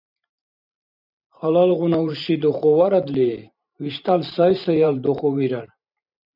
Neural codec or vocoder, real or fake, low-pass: vocoder, 44.1 kHz, 128 mel bands every 512 samples, BigVGAN v2; fake; 5.4 kHz